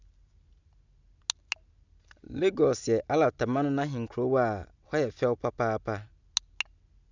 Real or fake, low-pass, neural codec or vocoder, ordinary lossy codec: real; 7.2 kHz; none; none